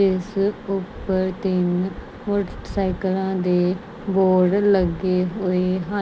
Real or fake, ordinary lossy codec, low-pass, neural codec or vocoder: real; none; none; none